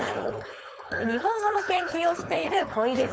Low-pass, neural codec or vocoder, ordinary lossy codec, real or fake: none; codec, 16 kHz, 4.8 kbps, FACodec; none; fake